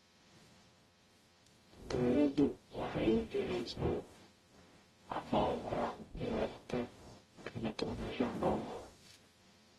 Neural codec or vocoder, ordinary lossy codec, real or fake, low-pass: codec, 44.1 kHz, 0.9 kbps, DAC; AAC, 32 kbps; fake; 19.8 kHz